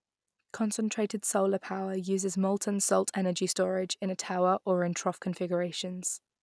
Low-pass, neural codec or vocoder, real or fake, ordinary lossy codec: none; none; real; none